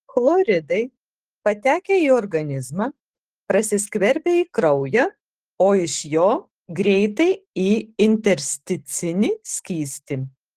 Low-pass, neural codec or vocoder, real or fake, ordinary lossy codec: 14.4 kHz; codec, 44.1 kHz, 7.8 kbps, DAC; fake; Opus, 16 kbps